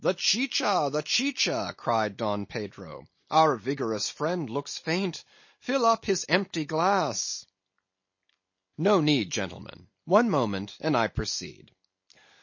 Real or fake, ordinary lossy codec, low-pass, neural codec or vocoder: real; MP3, 32 kbps; 7.2 kHz; none